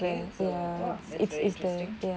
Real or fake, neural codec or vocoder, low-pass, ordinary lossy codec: real; none; none; none